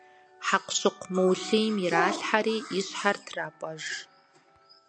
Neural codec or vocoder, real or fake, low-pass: none; real; 9.9 kHz